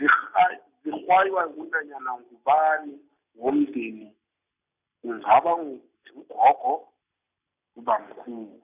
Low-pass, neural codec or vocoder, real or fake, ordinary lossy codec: 3.6 kHz; none; real; none